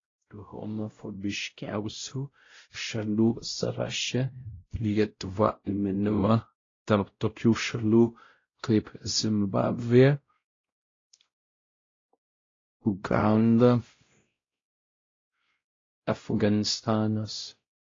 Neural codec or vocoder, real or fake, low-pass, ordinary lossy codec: codec, 16 kHz, 0.5 kbps, X-Codec, WavLM features, trained on Multilingual LibriSpeech; fake; 7.2 kHz; AAC, 32 kbps